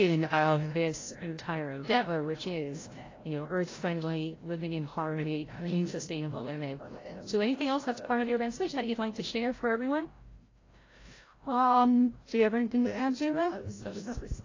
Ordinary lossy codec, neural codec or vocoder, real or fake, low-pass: AAC, 32 kbps; codec, 16 kHz, 0.5 kbps, FreqCodec, larger model; fake; 7.2 kHz